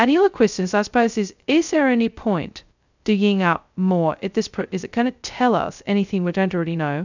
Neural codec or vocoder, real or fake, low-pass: codec, 16 kHz, 0.2 kbps, FocalCodec; fake; 7.2 kHz